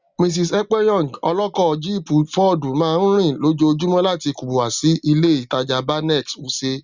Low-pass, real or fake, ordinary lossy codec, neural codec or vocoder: 7.2 kHz; real; Opus, 64 kbps; none